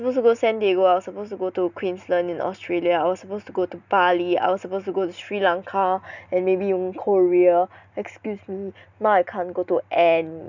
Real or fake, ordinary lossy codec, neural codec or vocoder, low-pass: real; none; none; 7.2 kHz